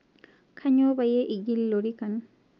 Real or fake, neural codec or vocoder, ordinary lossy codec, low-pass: real; none; none; 7.2 kHz